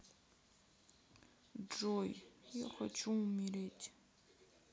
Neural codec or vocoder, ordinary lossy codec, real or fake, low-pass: none; none; real; none